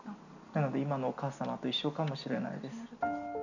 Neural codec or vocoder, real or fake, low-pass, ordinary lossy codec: none; real; 7.2 kHz; none